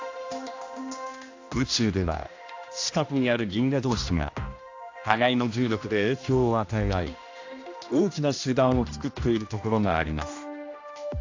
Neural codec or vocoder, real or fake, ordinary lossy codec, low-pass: codec, 16 kHz, 1 kbps, X-Codec, HuBERT features, trained on general audio; fake; AAC, 48 kbps; 7.2 kHz